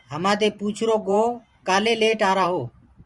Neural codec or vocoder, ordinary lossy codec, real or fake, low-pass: vocoder, 44.1 kHz, 128 mel bands every 512 samples, BigVGAN v2; Opus, 64 kbps; fake; 10.8 kHz